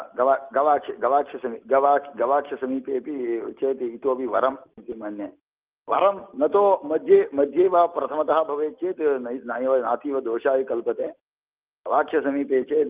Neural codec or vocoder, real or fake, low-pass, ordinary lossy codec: none; real; 3.6 kHz; Opus, 32 kbps